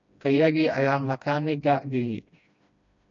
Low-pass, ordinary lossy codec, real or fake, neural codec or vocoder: 7.2 kHz; MP3, 48 kbps; fake; codec, 16 kHz, 1 kbps, FreqCodec, smaller model